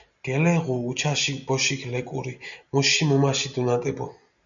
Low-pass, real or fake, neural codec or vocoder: 7.2 kHz; real; none